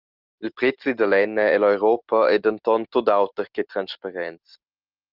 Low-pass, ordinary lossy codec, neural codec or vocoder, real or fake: 5.4 kHz; Opus, 24 kbps; none; real